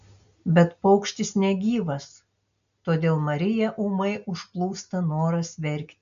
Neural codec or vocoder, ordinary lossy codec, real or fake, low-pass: none; AAC, 96 kbps; real; 7.2 kHz